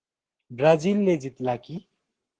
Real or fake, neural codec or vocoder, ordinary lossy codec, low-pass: fake; codec, 44.1 kHz, 7.8 kbps, Pupu-Codec; Opus, 16 kbps; 9.9 kHz